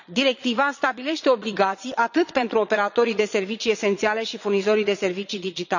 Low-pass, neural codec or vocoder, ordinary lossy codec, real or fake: 7.2 kHz; vocoder, 22.05 kHz, 80 mel bands, Vocos; none; fake